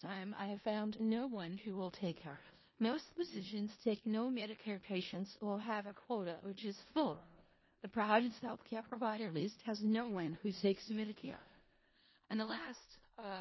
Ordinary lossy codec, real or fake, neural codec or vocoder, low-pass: MP3, 24 kbps; fake; codec, 16 kHz in and 24 kHz out, 0.4 kbps, LongCat-Audio-Codec, four codebook decoder; 7.2 kHz